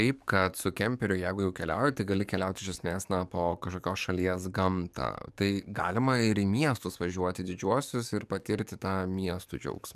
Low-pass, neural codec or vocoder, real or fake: 14.4 kHz; codec, 44.1 kHz, 7.8 kbps, DAC; fake